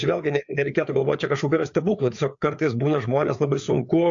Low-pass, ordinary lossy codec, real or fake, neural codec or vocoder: 7.2 kHz; AAC, 64 kbps; fake; codec, 16 kHz, 4 kbps, FreqCodec, larger model